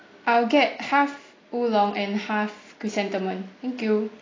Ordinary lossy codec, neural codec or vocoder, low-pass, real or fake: AAC, 32 kbps; none; 7.2 kHz; real